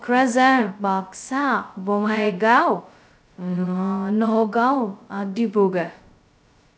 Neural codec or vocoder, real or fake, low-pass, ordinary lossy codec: codec, 16 kHz, 0.2 kbps, FocalCodec; fake; none; none